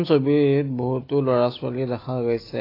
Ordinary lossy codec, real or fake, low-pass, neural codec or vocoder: AAC, 24 kbps; real; 5.4 kHz; none